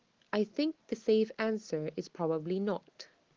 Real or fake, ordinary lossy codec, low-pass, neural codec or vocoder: real; Opus, 16 kbps; 7.2 kHz; none